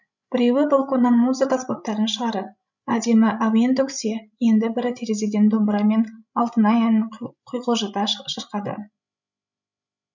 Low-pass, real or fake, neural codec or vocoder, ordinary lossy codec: 7.2 kHz; fake; codec, 16 kHz, 16 kbps, FreqCodec, larger model; none